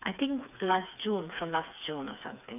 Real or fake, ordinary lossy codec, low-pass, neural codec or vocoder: fake; none; 3.6 kHz; codec, 16 kHz, 4 kbps, FreqCodec, smaller model